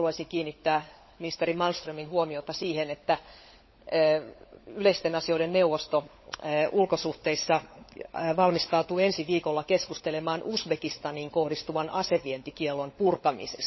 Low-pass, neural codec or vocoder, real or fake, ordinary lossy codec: 7.2 kHz; codec, 16 kHz, 16 kbps, FunCodec, trained on LibriTTS, 50 frames a second; fake; MP3, 24 kbps